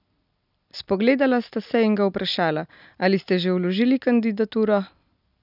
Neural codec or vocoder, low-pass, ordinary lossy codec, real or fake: none; 5.4 kHz; none; real